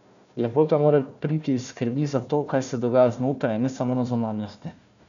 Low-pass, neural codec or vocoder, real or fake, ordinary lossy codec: 7.2 kHz; codec, 16 kHz, 1 kbps, FunCodec, trained on Chinese and English, 50 frames a second; fake; none